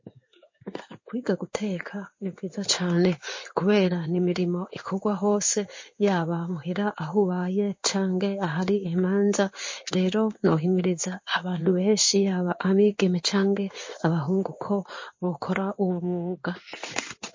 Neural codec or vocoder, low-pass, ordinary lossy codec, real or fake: codec, 16 kHz in and 24 kHz out, 1 kbps, XY-Tokenizer; 7.2 kHz; MP3, 32 kbps; fake